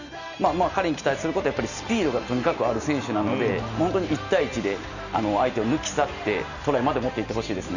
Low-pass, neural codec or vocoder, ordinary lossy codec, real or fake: 7.2 kHz; none; none; real